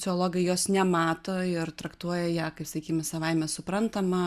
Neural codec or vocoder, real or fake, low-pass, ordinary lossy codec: none; real; 14.4 kHz; Opus, 64 kbps